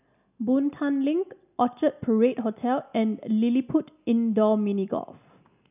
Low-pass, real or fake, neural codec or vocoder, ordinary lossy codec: 3.6 kHz; real; none; none